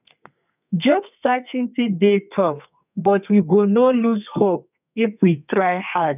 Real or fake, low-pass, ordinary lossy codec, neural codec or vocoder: fake; 3.6 kHz; none; codec, 32 kHz, 1.9 kbps, SNAC